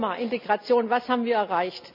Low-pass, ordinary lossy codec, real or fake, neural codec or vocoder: 5.4 kHz; MP3, 48 kbps; real; none